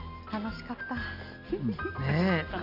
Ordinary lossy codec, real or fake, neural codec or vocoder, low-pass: none; real; none; 5.4 kHz